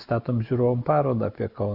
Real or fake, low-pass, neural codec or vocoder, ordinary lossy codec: real; 5.4 kHz; none; Opus, 64 kbps